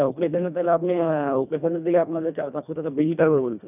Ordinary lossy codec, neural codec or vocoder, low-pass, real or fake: none; codec, 24 kHz, 1.5 kbps, HILCodec; 3.6 kHz; fake